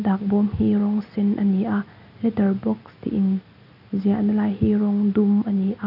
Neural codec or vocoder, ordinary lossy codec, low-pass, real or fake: none; none; 5.4 kHz; real